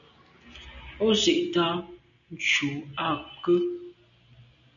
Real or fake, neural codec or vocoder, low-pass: real; none; 7.2 kHz